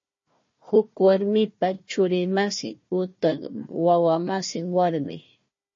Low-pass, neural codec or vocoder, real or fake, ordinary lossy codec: 7.2 kHz; codec, 16 kHz, 1 kbps, FunCodec, trained on Chinese and English, 50 frames a second; fake; MP3, 32 kbps